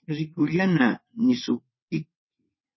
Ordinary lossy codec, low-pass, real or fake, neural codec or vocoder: MP3, 24 kbps; 7.2 kHz; fake; vocoder, 22.05 kHz, 80 mel bands, WaveNeXt